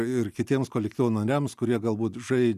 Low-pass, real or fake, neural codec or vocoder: 14.4 kHz; real; none